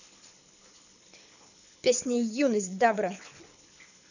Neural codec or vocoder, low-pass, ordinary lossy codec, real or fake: codec, 24 kHz, 6 kbps, HILCodec; 7.2 kHz; none; fake